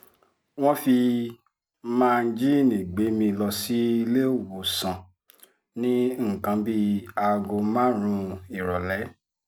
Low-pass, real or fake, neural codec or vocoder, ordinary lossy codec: none; real; none; none